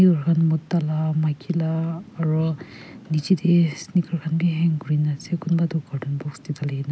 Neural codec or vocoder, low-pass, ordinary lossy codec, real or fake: none; none; none; real